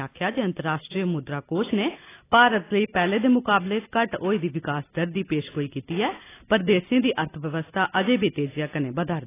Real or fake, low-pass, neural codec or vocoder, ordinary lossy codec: real; 3.6 kHz; none; AAC, 16 kbps